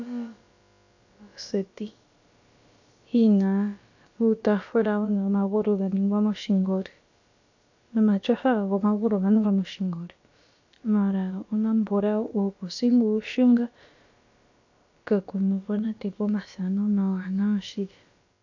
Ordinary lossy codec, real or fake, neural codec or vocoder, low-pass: AAC, 48 kbps; fake; codec, 16 kHz, about 1 kbps, DyCAST, with the encoder's durations; 7.2 kHz